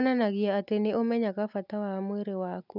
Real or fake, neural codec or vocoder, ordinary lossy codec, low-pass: real; none; none; 5.4 kHz